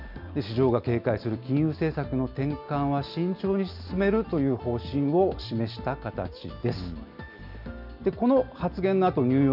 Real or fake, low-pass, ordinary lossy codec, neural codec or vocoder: real; 5.4 kHz; none; none